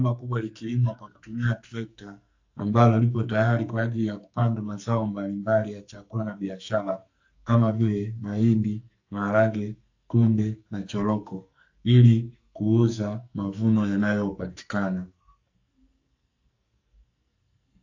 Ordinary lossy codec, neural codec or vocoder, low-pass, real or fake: AAC, 48 kbps; codec, 44.1 kHz, 2.6 kbps, SNAC; 7.2 kHz; fake